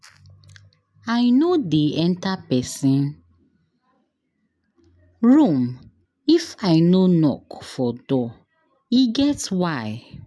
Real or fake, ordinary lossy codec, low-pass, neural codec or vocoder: real; none; none; none